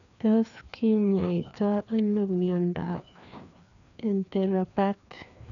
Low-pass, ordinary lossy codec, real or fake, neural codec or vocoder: 7.2 kHz; none; fake; codec, 16 kHz, 2 kbps, FreqCodec, larger model